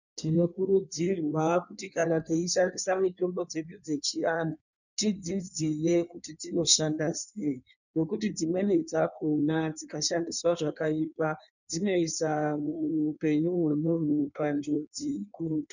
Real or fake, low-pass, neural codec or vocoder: fake; 7.2 kHz; codec, 16 kHz in and 24 kHz out, 1.1 kbps, FireRedTTS-2 codec